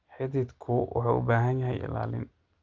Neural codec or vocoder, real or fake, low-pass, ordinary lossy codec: none; real; 7.2 kHz; Opus, 32 kbps